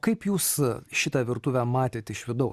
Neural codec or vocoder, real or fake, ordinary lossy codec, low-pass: none; real; Opus, 64 kbps; 14.4 kHz